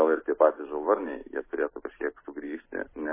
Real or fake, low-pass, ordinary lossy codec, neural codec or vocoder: real; 3.6 kHz; MP3, 16 kbps; none